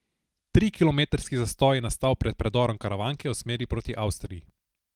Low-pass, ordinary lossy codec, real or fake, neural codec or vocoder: 19.8 kHz; Opus, 24 kbps; real; none